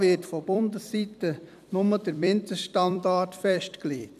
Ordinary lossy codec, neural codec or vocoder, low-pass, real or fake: none; vocoder, 44.1 kHz, 128 mel bands every 256 samples, BigVGAN v2; 14.4 kHz; fake